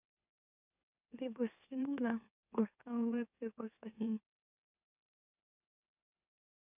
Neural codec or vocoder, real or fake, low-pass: autoencoder, 44.1 kHz, a latent of 192 numbers a frame, MeloTTS; fake; 3.6 kHz